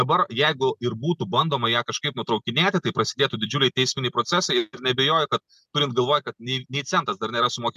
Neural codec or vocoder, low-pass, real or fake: none; 14.4 kHz; real